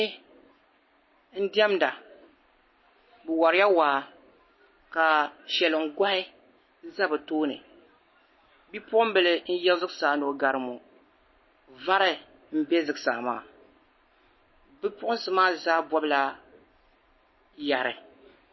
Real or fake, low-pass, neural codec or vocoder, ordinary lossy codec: real; 7.2 kHz; none; MP3, 24 kbps